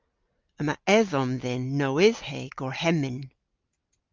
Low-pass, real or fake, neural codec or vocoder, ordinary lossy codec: 7.2 kHz; real; none; Opus, 24 kbps